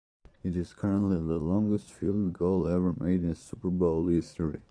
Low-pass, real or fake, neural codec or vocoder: 9.9 kHz; fake; vocoder, 22.05 kHz, 80 mel bands, Vocos